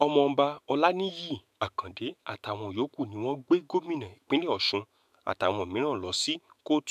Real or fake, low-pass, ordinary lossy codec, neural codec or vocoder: fake; 14.4 kHz; MP3, 96 kbps; autoencoder, 48 kHz, 128 numbers a frame, DAC-VAE, trained on Japanese speech